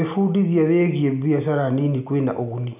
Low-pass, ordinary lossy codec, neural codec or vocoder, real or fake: 3.6 kHz; none; none; real